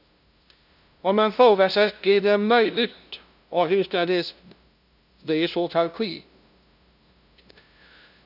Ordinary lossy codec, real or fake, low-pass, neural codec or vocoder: none; fake; 5.4 kHz; codec, 16 kHz, 0.5 kbps, FunCodec, trained on LibriTTS, 25 frames a second